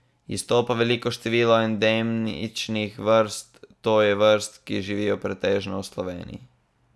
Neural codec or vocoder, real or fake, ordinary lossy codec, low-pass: none; real; none; none